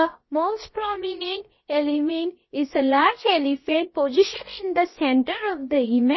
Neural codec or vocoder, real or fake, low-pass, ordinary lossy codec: codec, 16 kHz, about 1 kbps, DyCAST, with the encoder's durations; fake; 7.2 kHz; MP3, 24 kbps